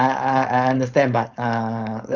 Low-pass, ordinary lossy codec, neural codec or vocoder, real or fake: 7.2 kHz; none; codec, 16 kHz, 4.8 kbps, FACodec; fake